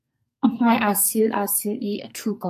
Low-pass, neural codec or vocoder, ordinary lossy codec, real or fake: 14.4 kHz; codec, 44.1 kHz, 2.6 kbps, SNAC; AAC, 96 kbps; fake